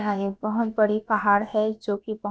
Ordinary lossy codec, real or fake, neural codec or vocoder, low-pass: none; fake; codec, 16 kHz, about 1 kbps, DyCAST, with the encoder's durations; none